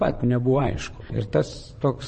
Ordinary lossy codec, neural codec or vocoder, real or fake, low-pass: MP3, 32 kbps; vocoder, 22.05 kHz, 80 mel bands, WaveNeXt; fake; 9.9 kHz